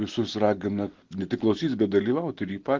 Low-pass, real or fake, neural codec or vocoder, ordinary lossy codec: 7.2 kHz; real; none; Opus, 16 kbps